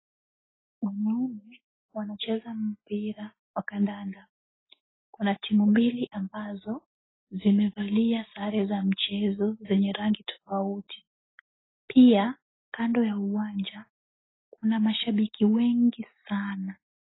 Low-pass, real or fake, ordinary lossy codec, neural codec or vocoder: 7.2 kHz; real; AAC, 16 kbps; none